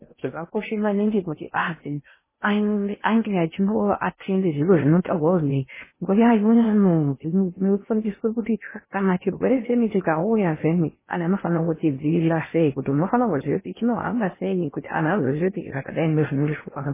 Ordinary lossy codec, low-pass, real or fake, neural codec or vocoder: MP3, 16 kbps; 3.6 kHz; fake; codec, 16 kHz in and 24 kHz out, 0.6 kbps, FocalCodec, streaming, 4096 codes